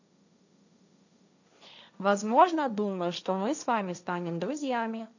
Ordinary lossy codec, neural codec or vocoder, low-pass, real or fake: none; codec, 16 kHz, 1.1 kbps, Voila-Tokenizer; 7.2 kHz; fake